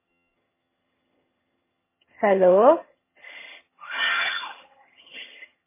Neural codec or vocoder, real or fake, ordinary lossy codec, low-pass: vocoder, 22.05 kHz, 80 mel bands, HiFi-GAN; fake; MP3, 16 kbps; 3.6 kHz